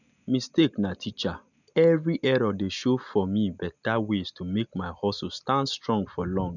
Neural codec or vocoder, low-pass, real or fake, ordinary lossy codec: none; 7.2 kHz; real; none